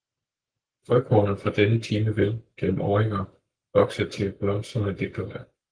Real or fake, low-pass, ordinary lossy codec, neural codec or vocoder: fake; 9.9 kHz; Opus, 24 kbps; codec, 44.1 kHz, 7.8 kbps, Pupu-Codec